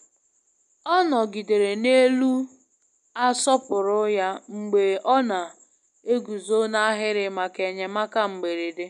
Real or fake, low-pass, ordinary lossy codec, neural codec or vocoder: real; 9.9 kHz; none; none